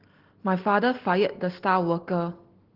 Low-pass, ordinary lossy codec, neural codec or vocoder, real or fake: 5.4 kHz; Opus, 16 kbps; none; real